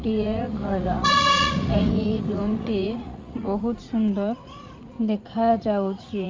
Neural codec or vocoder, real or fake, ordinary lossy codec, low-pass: vocoder, 44.1 kHz, 80 mel bands, Vocos; fake; Opus, 32 kbps; 7.2 kHz